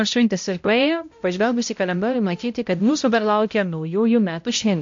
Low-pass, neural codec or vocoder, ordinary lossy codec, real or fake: 7.2 kHz; codec, 16 kHz, 0.5 kbps, X-Codec, HuBERT features, trained on balanced general audio; MP3, 48 kbps; fake